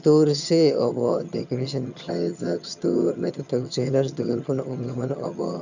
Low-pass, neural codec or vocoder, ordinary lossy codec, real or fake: 7.2 kHz; vocoder, 22.05 kHz, 80 mel bands, HiFi-GAN; none; fake